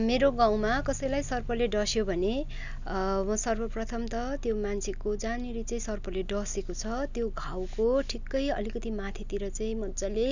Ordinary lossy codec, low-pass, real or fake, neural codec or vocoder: none; 7.2 kHz; real; none